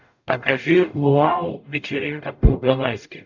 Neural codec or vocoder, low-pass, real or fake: codec, 44.1 kHz, 0.9 kbps, DAC; 7.2 kHz; fake